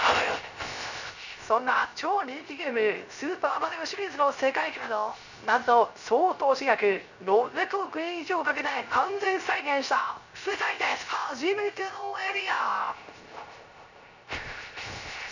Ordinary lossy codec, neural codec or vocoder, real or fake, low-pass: none; codec, 16 kHz, 0.3 kbps, FocalCodec; fake; 7.2 kHz